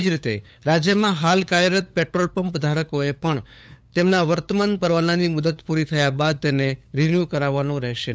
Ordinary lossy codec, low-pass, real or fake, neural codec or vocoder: none; none; fake; codec, 16 kHz, 8 kbps, FunCodec, trained on LibriTTS, 25 frames a second